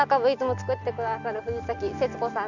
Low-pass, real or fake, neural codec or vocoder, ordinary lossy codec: 7.2 kHz; real; none; none